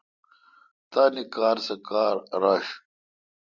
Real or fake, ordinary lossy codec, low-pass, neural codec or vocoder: real; Opus, 64 kbps; 7.2 kHz; none